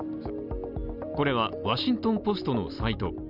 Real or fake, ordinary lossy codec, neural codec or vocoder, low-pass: real; none; none; 5.4 kHz